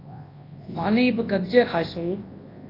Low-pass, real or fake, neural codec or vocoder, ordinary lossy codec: 5.4 kHz; fake; codec, 24 kHz, 0.9 kbps, WavTokenizer, large speech release; AAC, 24 kbps